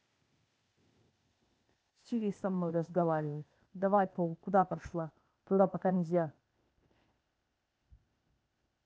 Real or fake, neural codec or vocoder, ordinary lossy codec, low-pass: fake; codec, 16 kHz, 0.8 kbps, ZipCodec; none; none